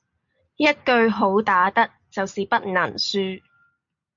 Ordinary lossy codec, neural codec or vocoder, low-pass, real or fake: MP3, 48 kbps; none; 7.2 kHz; real